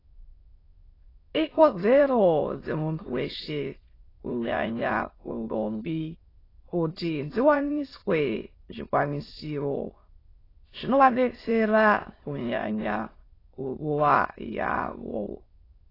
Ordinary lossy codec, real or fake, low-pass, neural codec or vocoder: AAC, 24 kbps; fake; 5.4 kHz; autoencoder, 22.05 kHz, a latent of 192 numbers a frame, VITS, trained on many speakers